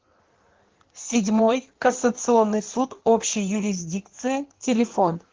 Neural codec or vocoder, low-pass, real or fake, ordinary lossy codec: codec, 16 kHz in and 24 kHz out, 1.1 kbps, FireRedTTS-2 codec; 7.2 kHz; fake; Opus, 16 kbps